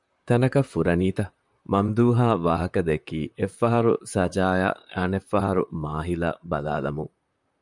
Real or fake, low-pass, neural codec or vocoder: fake; 10.8 kHz; vocoder, 44.1 kHz, 128 mel bands, Pupu-Vocoder